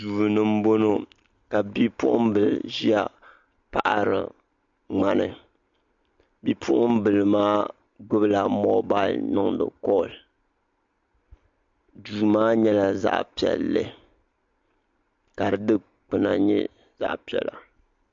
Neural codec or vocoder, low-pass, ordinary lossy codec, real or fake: none; 7.2 kHz; MP3, 48 kbps; real